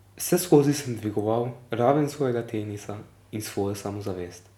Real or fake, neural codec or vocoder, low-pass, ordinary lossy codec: real; none; 19.8 kHz; none